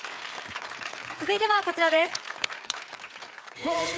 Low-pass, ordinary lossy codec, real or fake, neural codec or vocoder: none; none; fake; codec, 16 kHz, 4 kbps, FreqCodec, larger model